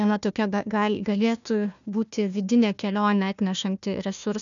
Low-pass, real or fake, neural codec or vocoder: 7.2 kHz; fake; codec, 16 kHz, 1 kbps, FunCodec, trained on Chinese and English, 50 frames a second